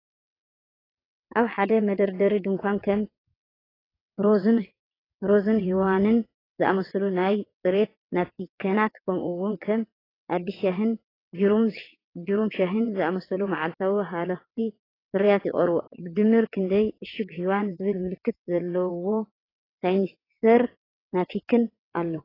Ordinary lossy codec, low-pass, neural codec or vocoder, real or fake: AAC, 24 kbps; 5.4 kHz; vocoder, 22.05 kHz, 80 mel bands, WaveNeXt; fake